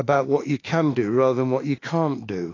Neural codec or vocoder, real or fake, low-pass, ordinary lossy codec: autoencoder, 48 kHz, 32 numbers a frame, DAC-VAE, trained on Japanese speech; fake; 7.2 kHz; AAC, 32 kbps